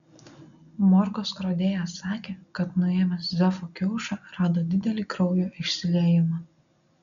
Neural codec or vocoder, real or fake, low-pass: none; real; 7.2 kHz